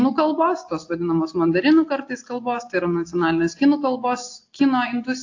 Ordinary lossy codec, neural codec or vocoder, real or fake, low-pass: AAC, 48 kbps; none; real; 7.2 kHz